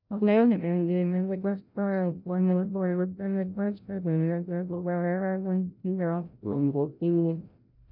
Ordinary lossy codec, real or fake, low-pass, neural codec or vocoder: none; fake; 5.4 kHz; codec, 16 kHz, 0.5 kbps, FreqCodec, larger model